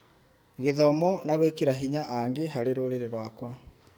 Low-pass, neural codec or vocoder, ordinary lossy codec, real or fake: none; codec, 44.1 kHz, 2.6 kbps, SNAC; none; fake